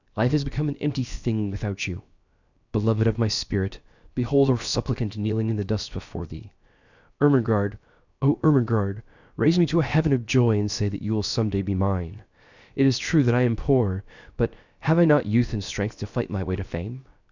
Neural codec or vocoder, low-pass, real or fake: codec, 16 kHz, 0.7 kbps, FocalCodec; 7.2 kHz; fake